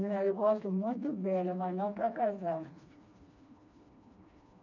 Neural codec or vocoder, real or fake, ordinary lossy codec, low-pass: codec, 16 kHz, 2 kbps, FreqCodec, smaller model; fake; none; 7.2 kHz